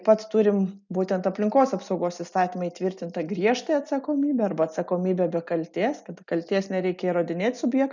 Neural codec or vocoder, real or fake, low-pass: none; real; 7.2 kHz